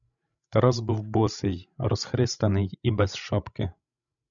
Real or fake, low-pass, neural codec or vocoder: fake; 7.2 kHz; codec, 16 kHz, 16 kbps, FreqCodec, larger model